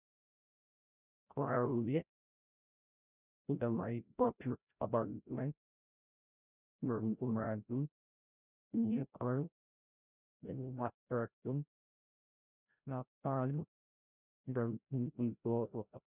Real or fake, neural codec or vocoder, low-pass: fake; codec, 16 kHz, 0.5 kbps, FreqCodec, larger model; 3.6 kHz